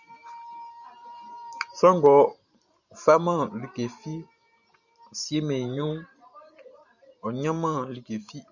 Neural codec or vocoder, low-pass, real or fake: vocoder, 44.1 kHz, 128 mel bands every 512 samples, BigVGAN v2; 7.2 kHz; fake